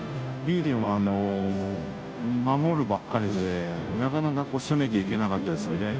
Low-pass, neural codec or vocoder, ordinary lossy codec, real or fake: none; codec, 16 kHz, 0.5 kbps, FunCodec, trained on Chinese and English, 25 frames a second; none; fake